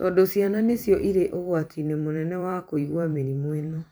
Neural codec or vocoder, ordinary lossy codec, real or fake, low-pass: vocoder, 44.1 kHz, 128 mel bands every 512 samples, BigVGAN v2; none; fake; none